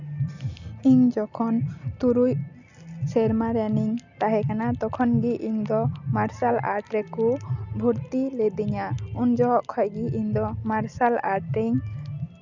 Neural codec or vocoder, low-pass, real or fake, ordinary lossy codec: none; 7.2 kHz; real; none